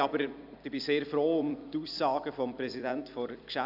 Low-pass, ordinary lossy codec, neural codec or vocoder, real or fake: 5.4 kHz; none; vocoder, 44.1 kHz, 128 mel bands every 256 samples, BigVGAN v2; fake